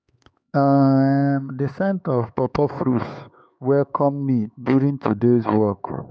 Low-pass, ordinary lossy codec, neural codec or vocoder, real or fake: none; none; codec, 16 kHz, 4 kbps, X-Codec, HuBERT features, trained on LibriSpeech; fake